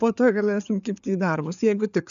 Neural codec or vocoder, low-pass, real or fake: codec, 16 kHz, 4 kbps, FreqCodec, larger model; 7.2 kHz; fake